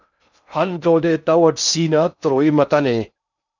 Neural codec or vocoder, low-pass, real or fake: codec, 16 kHz in and 24 kHz out, 0.6 kbps, FocalCodec, streaming, 2048 codes; 7.2 kHz; fake